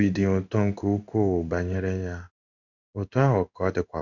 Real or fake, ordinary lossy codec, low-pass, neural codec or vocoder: fake; none; 7.2 kHz; codec, 16 kHz in and 24 kHz out, 1 kbps, XY-Tokenizer